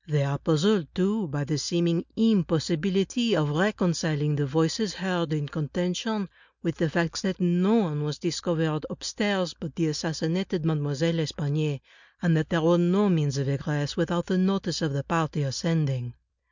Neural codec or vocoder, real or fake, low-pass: none; real; 7.2 kHz